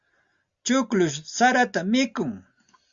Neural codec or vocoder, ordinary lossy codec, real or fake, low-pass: none; Opus, 64 kbps; real; 7.2 kHz